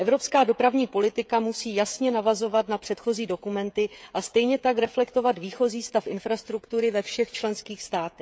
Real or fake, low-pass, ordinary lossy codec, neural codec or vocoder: fake; none; none; codec, 16 kHz, 16 kbps, FreqCodec, smaller model